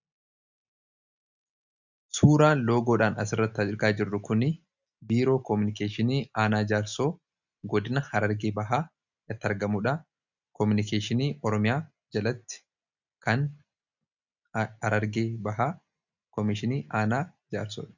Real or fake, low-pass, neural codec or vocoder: real; 7.2 kHz; none